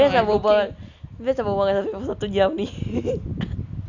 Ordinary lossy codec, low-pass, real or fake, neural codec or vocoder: none; 7.2 kHz; real; none